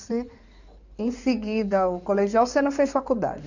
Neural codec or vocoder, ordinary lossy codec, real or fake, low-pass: codec, 16 kHz in and 24 kHz out, 2.2 kbps, FireRedTTS-2 codec; none; fake; 7.2 kHz